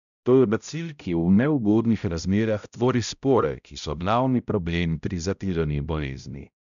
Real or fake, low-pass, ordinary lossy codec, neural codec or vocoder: fake; 7.2 kHz; none; codec, 16 kHz, 0.5 kbps, X-Codec, HuBERT features, trained on balanced general audio